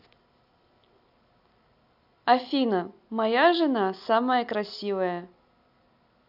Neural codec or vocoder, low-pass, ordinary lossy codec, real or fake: none; 5.4 kHz; none; real